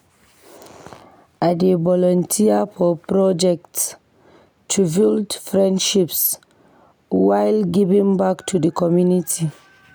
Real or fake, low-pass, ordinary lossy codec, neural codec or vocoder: real; none; none; none